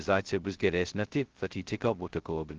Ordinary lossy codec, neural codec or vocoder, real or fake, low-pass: Opus, 16 kbps; codec, 16 kHz, 0.2 kbps, FocalCodec; fake; 7.2 kHz